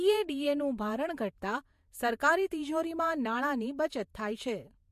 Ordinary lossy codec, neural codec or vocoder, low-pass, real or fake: MP3, 64 kbps; vocoder, 48 kHz, 128 mel bands, Vocos; 14.4 kHz; fake